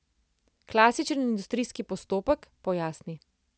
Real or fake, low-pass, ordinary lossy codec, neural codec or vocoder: real; none; none; none